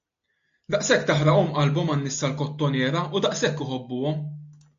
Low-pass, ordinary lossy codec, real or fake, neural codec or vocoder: 7.2 kHz; AAC, 64 kbps; real; none